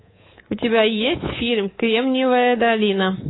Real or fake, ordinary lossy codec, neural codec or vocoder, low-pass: fake; AAC, 16 kbps; codec, 24 kHz, 3.1 kbps, DualCodec; 7.2 kHz